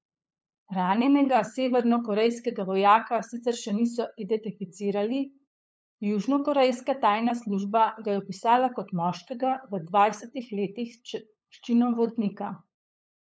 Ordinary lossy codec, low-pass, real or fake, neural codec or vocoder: none; none; fake; codec, 16 kHz, 8 kbps, FunCodec, trained on LibriTTS, 25 frames a second